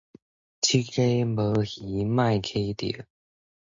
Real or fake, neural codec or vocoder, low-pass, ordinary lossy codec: real; none; 7.2 kHz; MP3, 48 kbps